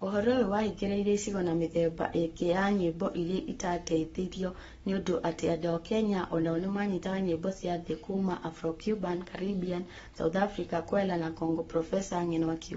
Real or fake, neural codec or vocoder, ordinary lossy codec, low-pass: fake; codec, 44.1 kHz, 7.8 kbps, Pupu-Codec; AAC, 24 kbps; 19.8 kHz